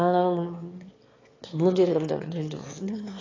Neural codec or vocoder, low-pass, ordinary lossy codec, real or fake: autoencoder, 22.05 kHz, a latent of 192 numbers a frame, VITS, trained on one speaker; 7.2 kHz; AAC, 32 kbps; fake